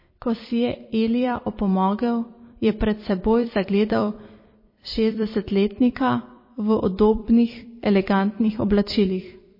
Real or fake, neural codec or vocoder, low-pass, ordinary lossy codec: fake; vocoder, 44.1 kHz, 128 mel bands every 256 samples, BigVGAN v2; 5.4 kHz; MP3, 24 kbps